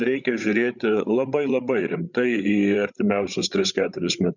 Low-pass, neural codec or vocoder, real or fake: 7.2 kHz; codec, 16 kHz, 8 kbps, FreqCodec, larger model; fake